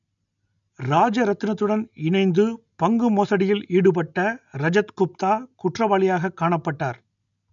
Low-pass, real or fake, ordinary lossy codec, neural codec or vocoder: 7.2 kHz; real; none; none